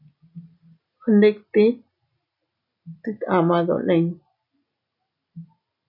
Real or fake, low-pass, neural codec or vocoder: real; 5.4 kHz; none